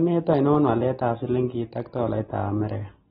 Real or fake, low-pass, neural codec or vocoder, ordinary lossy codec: real; 19.8 kHz; none; AAC, 16 kbps